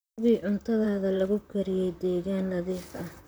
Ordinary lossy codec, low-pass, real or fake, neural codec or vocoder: none; none; fake; vocoder, 44.1 kHz, 128 mel bands, Pupu-Vocoder